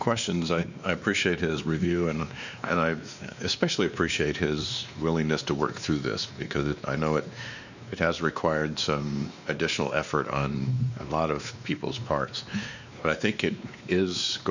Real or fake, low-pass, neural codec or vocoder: fake; 7.2 kHz; codec, 16 kHz, 2 kbps, X-Codec, WavLM features, trained on Multilingual LibriSpeech